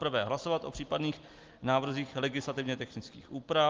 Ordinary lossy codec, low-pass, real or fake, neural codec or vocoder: Opus, 24 kbps; 7.2 kHz; real; none